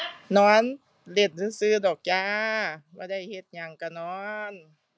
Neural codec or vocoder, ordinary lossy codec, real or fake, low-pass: none; none; real; none